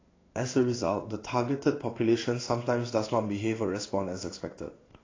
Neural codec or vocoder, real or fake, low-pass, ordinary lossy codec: codec, 16 kHz in and 24 kHz out, 1 kbps, XY-Tokenizer; fake; 7.2 kHz; AAC, 32 kbps